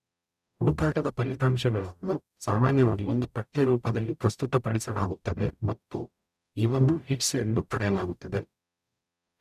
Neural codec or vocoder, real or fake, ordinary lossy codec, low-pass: codec, 44.1 kHz, 0.9 kbps, DAC; fake; none; 14.4 kHz